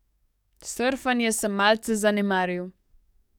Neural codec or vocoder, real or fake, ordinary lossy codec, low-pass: codec, 44.1 kHz, 7.8 kbps, DAC; fake; none; 19.8 kHz